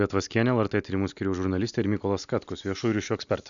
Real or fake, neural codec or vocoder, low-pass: real; none; 7.2 kHz